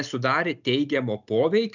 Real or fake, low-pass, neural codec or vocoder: real; 7.2 kHz; none